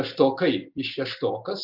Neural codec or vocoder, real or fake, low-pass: none; real; 5.4 kHz